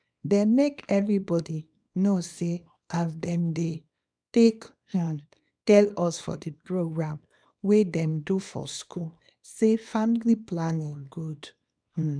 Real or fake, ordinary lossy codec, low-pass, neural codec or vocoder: fake; none; 9.9 kHz; codec, 24 kHz, 0.9 kbps, WavTokenizer, small release